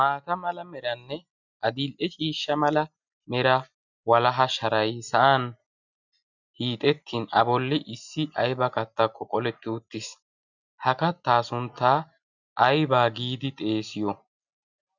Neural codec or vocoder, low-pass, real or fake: none; 7.2 kHz; real